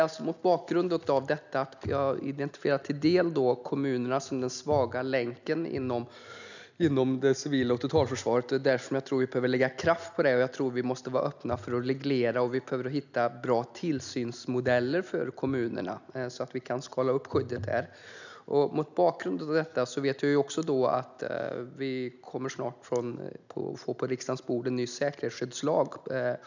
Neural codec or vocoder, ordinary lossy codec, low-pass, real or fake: none; none; 7.2 kHz; real